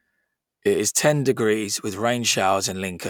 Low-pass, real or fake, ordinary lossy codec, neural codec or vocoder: 19.8 kHz; real; none; none